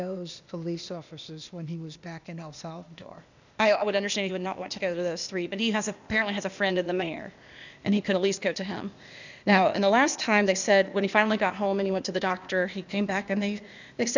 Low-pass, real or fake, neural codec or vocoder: 7.2 kHz; fake; codec, 16 kHz, 0.8 kbps, ZipCodec